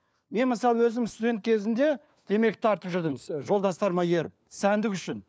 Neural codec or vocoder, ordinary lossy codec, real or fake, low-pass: codec, 16 kHz, 4 kbps, FunCodec, trained on LibriTTS, 50 frames a second; none; fake; none